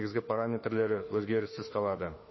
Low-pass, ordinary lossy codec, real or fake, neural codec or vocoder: 7.2 kHz; MP3, 24 kbps; fake; autoencoder, 48 kHz, 32 numbers a frame, DAC-VAE, trained on Japanese speech